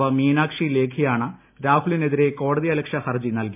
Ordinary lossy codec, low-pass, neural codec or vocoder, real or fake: MP3, 32 kbps; 3.6 kHz; none; real